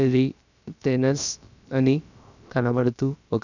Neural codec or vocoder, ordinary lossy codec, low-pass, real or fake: codec, 16 kHz, about 1 kbps, DyCAST, with the encoder's durations; none; 7.2 kHz; fake